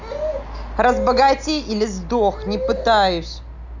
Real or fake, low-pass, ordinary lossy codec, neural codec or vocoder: real; 7.2 kHz; none; none